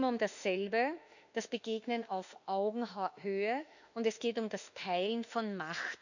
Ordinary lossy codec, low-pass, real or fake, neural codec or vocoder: none; 7.2 kHz; fake; autoencoder, 48 kHz, 32 numbers a frame, DAC-VAE, trained on Japanese speech